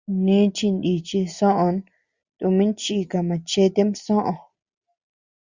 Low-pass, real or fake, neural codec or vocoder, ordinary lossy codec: 7.2 kHz; real; none; Opus, 64 kbps